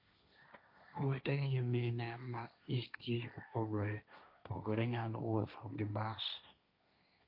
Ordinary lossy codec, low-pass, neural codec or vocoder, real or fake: MP3, 48 kbps; 5.4 kHz; codec, 16 kHz, 1.1 kbps, Voila-Tokenizer; fake